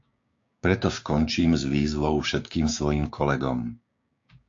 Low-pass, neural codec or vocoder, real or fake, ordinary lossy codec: 7.2 kHz; codec, 16 kHz, 6 kbps, DAC; fake; AAC, 48 kbps